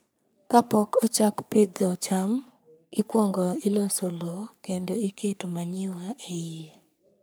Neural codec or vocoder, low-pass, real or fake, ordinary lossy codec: codec, 44.1 kHz, 3.4 kbps, Pupu-Codec; none; fake; none